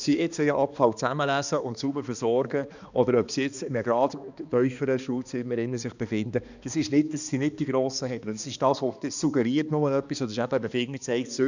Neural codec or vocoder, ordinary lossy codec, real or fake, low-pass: codec, 16 kHz, 2 kbps, X-Codec, HuBERT features, trained on balanced general audio; none; fake; 7.2 kHz